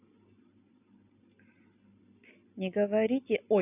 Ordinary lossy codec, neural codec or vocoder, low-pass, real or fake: none; none; 3.6 kHz; real